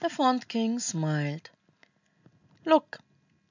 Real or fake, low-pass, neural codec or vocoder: real; 7.2 kHz; none